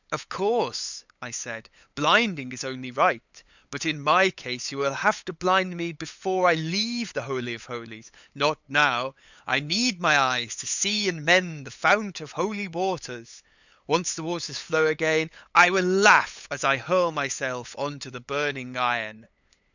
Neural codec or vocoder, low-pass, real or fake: codec, 16 kHz, 8 kbps, FunCodec, trained on LibriTTS, 25 frames a second; 7.2 kHz; fake